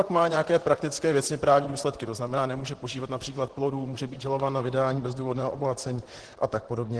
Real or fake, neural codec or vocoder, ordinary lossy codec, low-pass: fake; vocoder, 44.1 kHz, 128 mel bands, Pupu-Vocoder; Opus, 16 kbps; 10.8 kHz